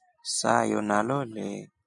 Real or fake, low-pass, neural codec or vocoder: real; 10.8 kHz; none